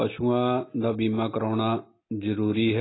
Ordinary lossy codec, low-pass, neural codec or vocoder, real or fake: AAC, 16 kbps; 7.2 kHz; none; real